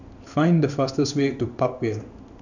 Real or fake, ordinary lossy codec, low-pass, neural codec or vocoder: fake; none; 7.2 kHz; codec, 16 kHz in and 24 kHz out, 1 kbps, XY-Tokenizer